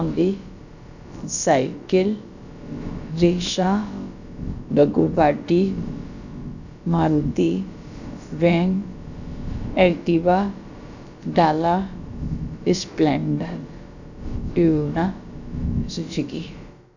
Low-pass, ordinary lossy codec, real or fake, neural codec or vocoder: 7.2 kHz; none; fake; codec, 16 kHz, about 1 kbps, DyCAST, with the encoder's durations